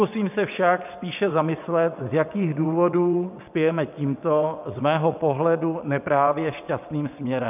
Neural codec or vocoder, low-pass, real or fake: vocoder, 22.05 kHz, 80 mel bands, WaveNeXt; 3.6 kHz; fake